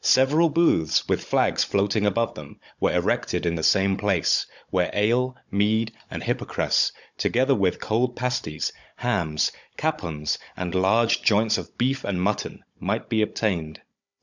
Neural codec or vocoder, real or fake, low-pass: codec, 16 kHz, 16 kbps, FunCodec, trained on Chinese and English, 50 frames a second; fake; 7.2 kHz